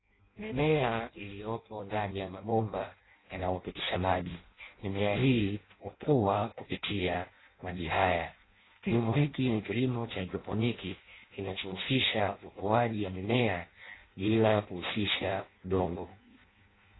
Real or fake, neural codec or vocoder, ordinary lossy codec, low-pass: fake; codec, 16 kHz in and 24 kHz out, 0.6 kbps, FireRedTTS-2 codec; AAC, 16 kbps; 7.2 kHz